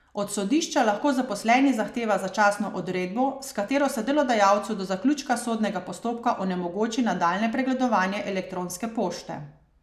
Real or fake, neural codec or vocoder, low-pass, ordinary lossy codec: real; none; 14.4 kHz; none